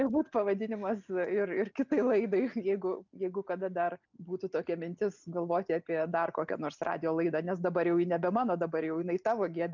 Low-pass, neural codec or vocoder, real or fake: 7.2 kHz; none; real